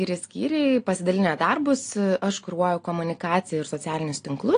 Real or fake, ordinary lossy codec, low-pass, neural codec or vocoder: real; AAC, 48 kbps; 9.9 kHz; none